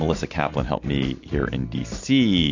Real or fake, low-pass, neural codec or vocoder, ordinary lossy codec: real; 7.2 kHz; none; MP3, 48 kbps